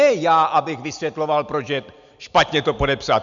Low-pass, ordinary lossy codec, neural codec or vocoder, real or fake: 7.2 kHz; MP3, 96 kbps; none; real